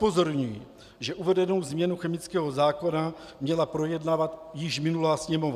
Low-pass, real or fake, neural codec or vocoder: 14.4 kHz; real; none